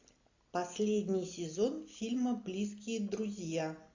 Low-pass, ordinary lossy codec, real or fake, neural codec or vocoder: 7.2 kHz; AAC, 48 kbps; real; none